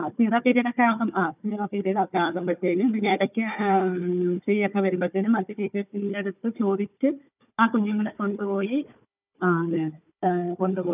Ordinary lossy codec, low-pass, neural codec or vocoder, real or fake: none; 3.6 kHz; codec, 16 kHz, 4 kbps, FunCodec, trained on Chinese and English, 50 frames a second; fake